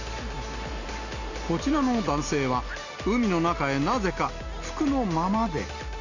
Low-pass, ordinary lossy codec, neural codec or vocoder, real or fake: 7.2 kHz; none; none; real